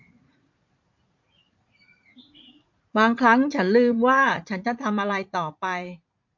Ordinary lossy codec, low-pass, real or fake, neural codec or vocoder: MP3, 48 kbps; 7.2 kHz; fake; vocoder, 44.1 kHz, 80 mel bands, Vocos